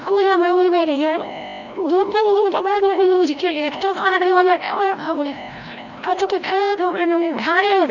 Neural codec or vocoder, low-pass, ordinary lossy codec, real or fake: codec, 16 kHz, 0.5 kbps, FreqCodec, larger model; 7.2 kHz; none; fake